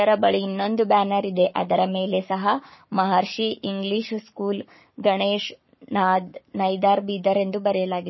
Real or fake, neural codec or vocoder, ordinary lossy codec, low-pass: fake; codec, 44.1 kHz, 7.8 kbps, Pupu-Codec; MP3, 24 kbps; 7.2 kHz